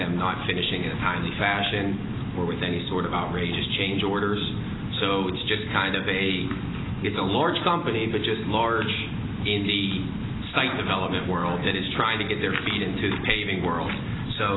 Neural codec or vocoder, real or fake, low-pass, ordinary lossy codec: none; real; 7.2 kHz; AAC, 16 kbps